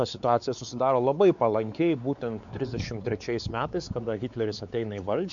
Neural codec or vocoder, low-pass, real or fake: codec, 16 kHz, 4 kbps, X-Codec, WavLM features, trained on Multilingual LibriSpeech; 7.2 kHz; fake